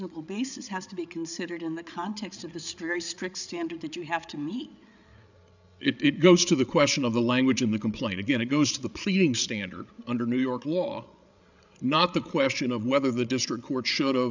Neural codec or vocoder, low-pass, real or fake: codec, 16 kHz, 8 kbps, FreqCodec, larger model; 7.2 kHz; fake